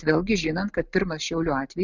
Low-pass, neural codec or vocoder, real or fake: 7.2 kHz; none; real